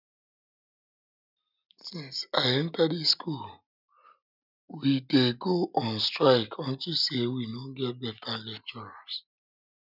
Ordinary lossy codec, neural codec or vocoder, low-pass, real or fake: none; none; 5.4 kHz; real